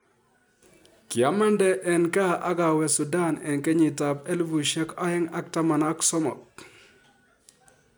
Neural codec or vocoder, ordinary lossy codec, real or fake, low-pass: none; none; real; none